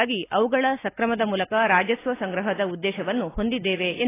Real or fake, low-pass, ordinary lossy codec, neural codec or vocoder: real; 3.6 kHz; AAC, 16 kbps; none